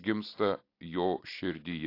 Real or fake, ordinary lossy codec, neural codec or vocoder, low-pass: real; AAC, 32 kbps; none; 5.4 kHz